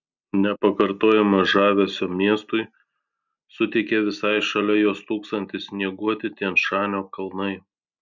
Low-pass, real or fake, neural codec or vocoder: 7.2 kHz; real; none